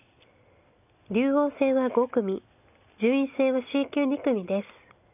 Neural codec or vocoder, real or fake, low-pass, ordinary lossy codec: none; real; 3.6 kHz; none